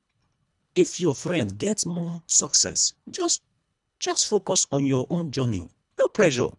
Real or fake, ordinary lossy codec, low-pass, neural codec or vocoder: fake; none; 10.8 kHz; codec, 24 kHz, 1.5 kbps, HILCodec